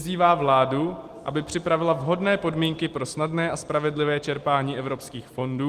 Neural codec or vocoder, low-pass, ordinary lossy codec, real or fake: none; 14.4 kHz; Opus, 24 kbps; real